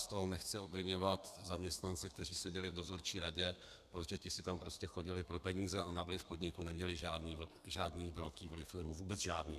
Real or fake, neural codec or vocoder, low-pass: fake; codec, 32 kHz, 1.9 kbps, SNAC; 14.4 kHz